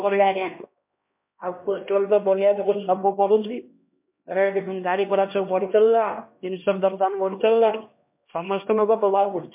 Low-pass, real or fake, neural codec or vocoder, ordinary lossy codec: 3.6 kHz; fake; codec, 16 kHz, 1 kbps, X-Codec, HuBERT features, trained on LibriSpeech; MP3, 32 kbps